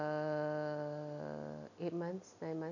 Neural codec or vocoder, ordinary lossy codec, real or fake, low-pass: none; none; real; 7.2 kHz